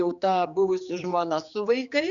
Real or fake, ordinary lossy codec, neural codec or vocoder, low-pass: fake; Opus, 64 kbps; codec, 16 kHz, 4 kbps, X-Codec, HuBERT features, trained on general audio; 7.2 kHz